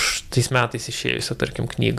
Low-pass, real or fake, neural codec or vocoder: 14.4 kHz; fake; vocoder, 44.1 kHz, 128 mel bands every 512 samples, BigVGAN v2